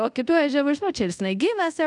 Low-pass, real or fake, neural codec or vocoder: 10.8 kHz; fake; codec, 24 kHz, 0.5 kbps, DualCodec